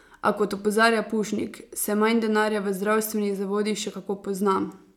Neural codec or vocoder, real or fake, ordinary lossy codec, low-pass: none; real; none; 19.8 kHz